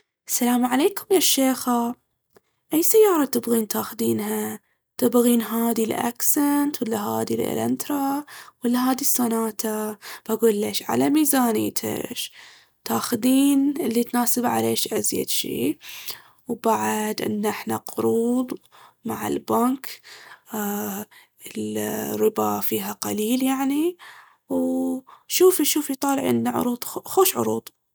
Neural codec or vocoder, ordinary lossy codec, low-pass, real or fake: none; none; none; real